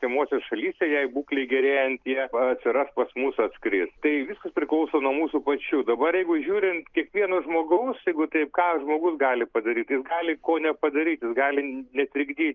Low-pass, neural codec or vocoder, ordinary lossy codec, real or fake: 7.2 kHz; none; Opus, 32 kbps; real